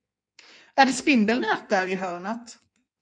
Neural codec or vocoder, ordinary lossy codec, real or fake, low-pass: codec, 16 kHz in and 24 kHz out, 1.1 kbps, FireRedTTS-2 codec; MP3, 64 kbps; fake; 9.9 kHz